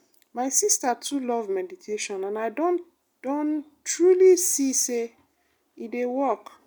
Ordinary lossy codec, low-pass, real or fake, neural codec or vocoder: none; none; real; none